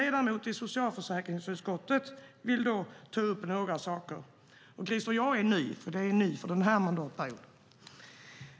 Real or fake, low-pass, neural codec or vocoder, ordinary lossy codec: real; none; none; none